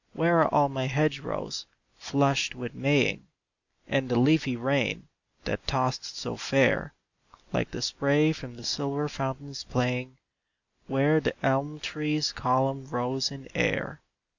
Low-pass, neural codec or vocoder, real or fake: 7.2 kHz; none; real